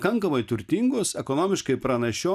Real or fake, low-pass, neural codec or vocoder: real; 14.4 kHz; none